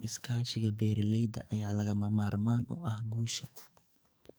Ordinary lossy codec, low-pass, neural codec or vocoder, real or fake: none; none; codec, 44.1 kHz, 2.6 kbps, SNAC; fake